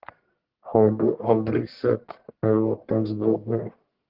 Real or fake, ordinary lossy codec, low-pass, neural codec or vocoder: fake; Opus, 16 kbps; 5.4 kHz; codec, 44.1 kHz, 1.7 kbps, Pupu-Codec